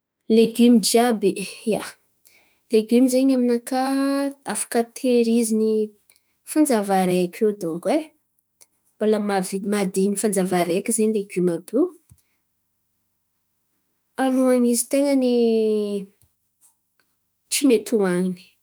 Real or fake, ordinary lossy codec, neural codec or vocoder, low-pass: fake; none; autoencoder, 48 kHz, 32 numbers a frame, DAC-VAE, trained on Japanese speech; none